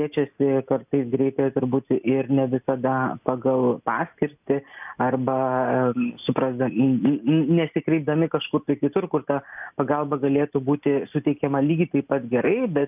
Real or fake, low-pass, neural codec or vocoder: real; 3.6 kHz; none